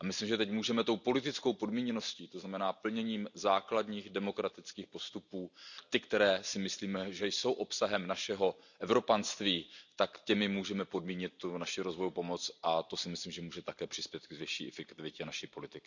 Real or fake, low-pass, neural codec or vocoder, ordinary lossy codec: real; 7.2 kHz; none; none